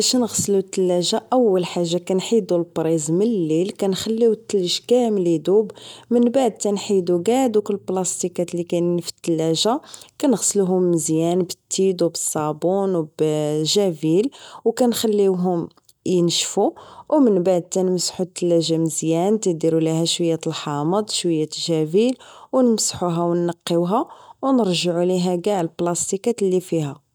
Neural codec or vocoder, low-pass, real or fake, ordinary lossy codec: none; none; real; none